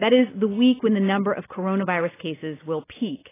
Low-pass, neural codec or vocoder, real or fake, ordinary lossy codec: 3.6 kHz; none; real; AAC, 16 kbps